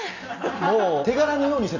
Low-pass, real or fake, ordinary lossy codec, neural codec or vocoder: 7.2 kHz; real; none; none